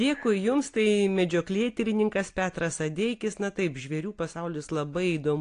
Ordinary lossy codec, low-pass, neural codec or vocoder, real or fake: AAC, 48 kbps; 9.9 kHz; none; real